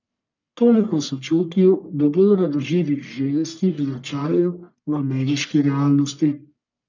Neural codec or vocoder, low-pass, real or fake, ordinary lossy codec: codec, 44.1 kHz, 1.7 kbps, Pupu-Codec; 7.2 kHz; fake; none